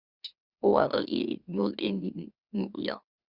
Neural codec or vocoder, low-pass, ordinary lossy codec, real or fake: autoencoder, 44.1 kHz, a latent of 192 numbers a frame, MeloTTS; 5.4 kHz; Opus, 64 kbps; fake